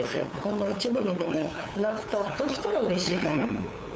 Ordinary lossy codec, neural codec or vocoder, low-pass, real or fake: none; codec, 16 kHz, 8 kbps, FunCodec, trained on LibriTTS, 25 frames a second; none; fake